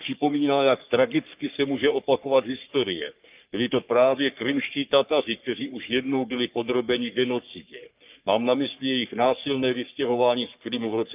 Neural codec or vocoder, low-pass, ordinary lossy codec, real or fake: codec, 44.1 kHz, 3.4 kbps, Pupu-Codec; 3.6 kHz; Opus, 24 kbps; fake